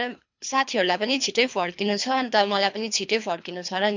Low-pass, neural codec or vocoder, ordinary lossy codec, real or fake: 7.2 kHz; codec, 24 kHz, 3 kbps, HILCodec; MP3, 64 kbps; fake